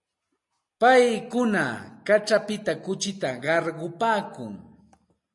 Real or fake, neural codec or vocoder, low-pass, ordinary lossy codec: real; none; 10.8 kHz; MP3, 48 kbps